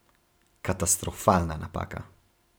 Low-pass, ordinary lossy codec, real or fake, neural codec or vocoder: none; none; fake; vocoder, 44.1 kHz, 128 mel bands every 512 samples, BigVGAN v2